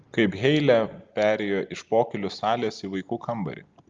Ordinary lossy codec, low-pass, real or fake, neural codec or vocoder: Opus, 32 kbps; 7.2 kHz; real; none